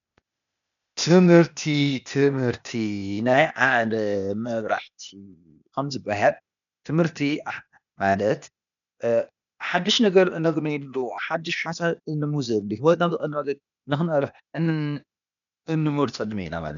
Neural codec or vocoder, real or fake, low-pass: codec, 16 kHz, 0.8 kbps, ZipCodec; fake; 7.2 kHz